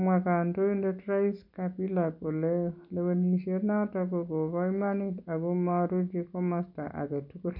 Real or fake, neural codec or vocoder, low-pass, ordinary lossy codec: real; none; 5.4 kHz; none